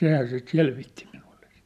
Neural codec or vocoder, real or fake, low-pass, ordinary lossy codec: none; real; 14.4 kHz; none